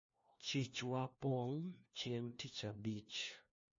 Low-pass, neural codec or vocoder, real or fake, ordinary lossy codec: 7.2 kHz; codec, 16 kHz, 1 kbps, FunCodec, trained on LibriTTS, 50 frames a second; fake; MP3, 32 kbps